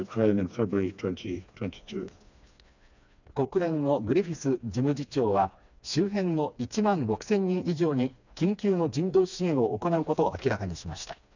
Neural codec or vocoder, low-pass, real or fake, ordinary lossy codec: codec, 16 kHz, 2 kbps, FreqCodec, smaller model; 7.2 kHz; fake; none